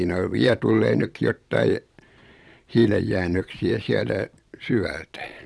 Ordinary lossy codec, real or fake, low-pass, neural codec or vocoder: none; fake; none; vocoder, 22.05 kHz, 80 mel bands, Vocos